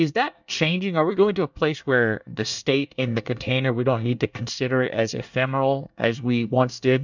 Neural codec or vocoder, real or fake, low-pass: codec, 24 kHz, 1 kbps, SNAC; fake; 7.2 kHz